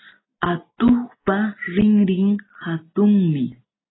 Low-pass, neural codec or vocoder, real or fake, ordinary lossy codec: 7.2 kHz; none; real; AAC, 16 kbps